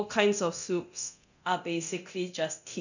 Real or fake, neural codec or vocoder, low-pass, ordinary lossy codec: fake; codec, 24 kHz, 0.9 kbps, DualCodec; 7.2 kHz; none